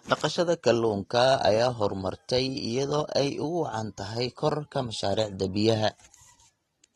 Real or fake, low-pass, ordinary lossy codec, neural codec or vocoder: real; 19.8 kHz; AAC, 32 kbps; none